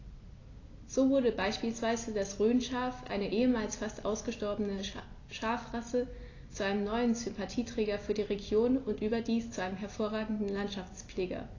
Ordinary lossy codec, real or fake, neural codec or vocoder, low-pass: AAC, 32 kbps; real; none; 7.2 kHz